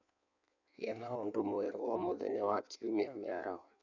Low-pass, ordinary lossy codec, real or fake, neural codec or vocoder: 7.2 kHz; none; fake; codec, 16 kHz in and 24 kHz out, 1.1 kbps, FireRedTTS-2 codec